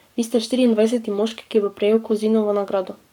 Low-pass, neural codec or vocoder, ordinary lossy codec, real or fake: 19.8 kHz; codec, 44.1 kHz, 7.8 kbps, Pupu-Codec; none; fake